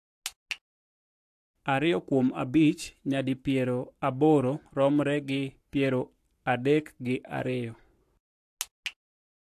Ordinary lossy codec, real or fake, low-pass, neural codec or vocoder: AAC, 64 kbps; fake; 14.4 kHz; codec, 44.1 kHz, 7.8 kbps, Pupu-Codec